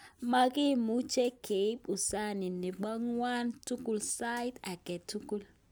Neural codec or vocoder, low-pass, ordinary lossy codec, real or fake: none; none; none; real